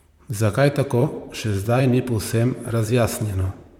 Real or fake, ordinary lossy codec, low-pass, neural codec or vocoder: fake; MP3, 96 kbps; 19.8 kHz; vocoder, 44.1 kHz, 128 mel bands, Pupu-Vocoder